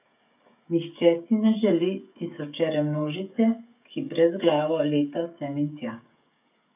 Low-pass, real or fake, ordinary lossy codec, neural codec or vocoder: 3.6 kHz; fake; none; codec, 16 kHz, 16 kbps, FreqCodec, smaller model